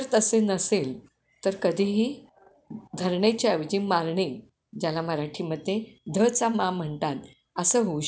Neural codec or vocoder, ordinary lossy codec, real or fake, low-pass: none; none; real; none